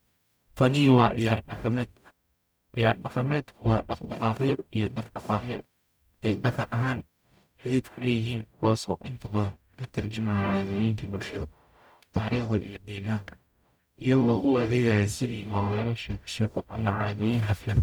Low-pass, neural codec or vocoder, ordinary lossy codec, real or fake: none; codec, 44.1 kHz, 0.9 kbps, DAC; none; fake